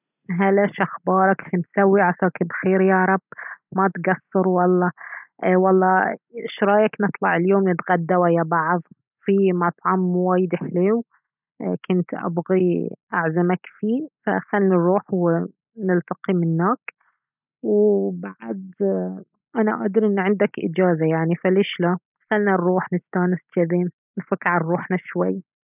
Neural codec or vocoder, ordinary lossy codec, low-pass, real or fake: none; none; 3.6 kHz; real